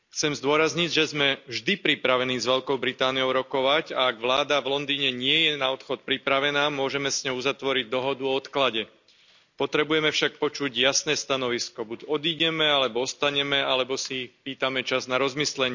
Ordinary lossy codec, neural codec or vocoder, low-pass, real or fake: none; none; 7.2 kHz; real